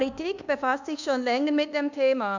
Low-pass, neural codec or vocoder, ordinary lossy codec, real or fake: 7.2 kHz; codec, 24 kHz, 1.2 kbps, DualCodec; none; fake